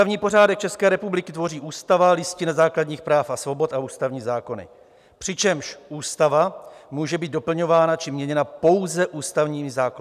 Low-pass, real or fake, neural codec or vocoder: 14.4 kHz; real; none